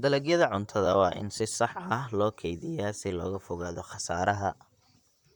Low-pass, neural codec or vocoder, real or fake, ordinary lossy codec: 19.8 kHz; vocoder, 44.1 kHz, 128 mel bands, Pupu-Vocoder; fake; none